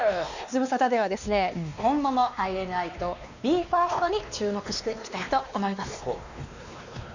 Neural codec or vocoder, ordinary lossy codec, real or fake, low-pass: codec, 16 kHz, 2 kbps, X-Codec, WavLM features, trained on Multilingual LibriSpeech; none; fake; 7.2 kHz